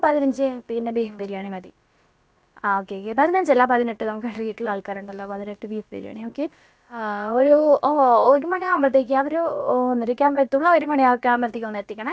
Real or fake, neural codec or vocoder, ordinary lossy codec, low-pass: fake; codec, 16 kHz, about 1 kbps, DyCAST, with the encoder's durations; none; none